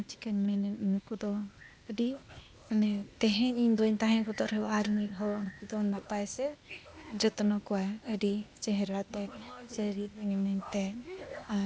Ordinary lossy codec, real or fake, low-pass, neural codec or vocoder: none; fake; none; codec, 16 kHz, 0.8 kbps, ZipCodec